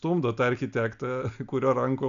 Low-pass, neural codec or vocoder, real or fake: 7.2 kHz; none; real